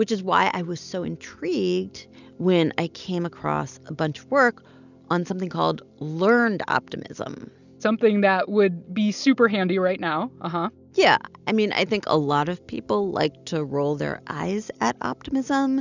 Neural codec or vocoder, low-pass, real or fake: none; 7.2 kHz; real